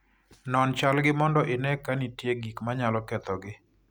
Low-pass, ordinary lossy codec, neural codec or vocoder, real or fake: none; none; none; real